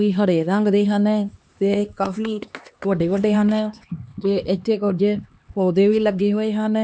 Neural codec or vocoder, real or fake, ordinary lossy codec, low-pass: codec, 16 kHz, 2 kbps, X-Codec, HuBERT features, trained on LibriSpeech; fake; none; none